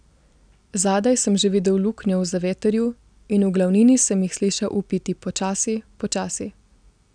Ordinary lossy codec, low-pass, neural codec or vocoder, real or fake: none; 9.9 kHz; none; real